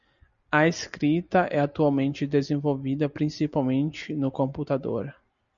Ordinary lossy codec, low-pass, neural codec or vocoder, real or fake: MP3, 96 kbps; 7.2 kHz; none; real